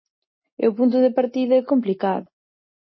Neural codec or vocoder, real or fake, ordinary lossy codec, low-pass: none; real; MP3, 24 kbps; 7.2 kHz